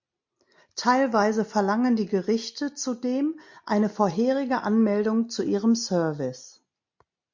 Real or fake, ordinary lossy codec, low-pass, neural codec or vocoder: real; MP3, 48 kbps; 7.2 kHz; none